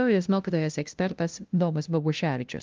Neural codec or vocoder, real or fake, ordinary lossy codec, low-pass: codec, 16 kHz, 0.5 kbps, FunCodec, trained on LibriTTS, 25 frames a second; fake; Opus, 24 kbps; 7.2 kHz